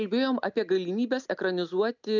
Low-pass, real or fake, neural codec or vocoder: 7.2 kHz; real; none